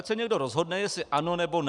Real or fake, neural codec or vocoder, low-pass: real; none; 10.8 kHz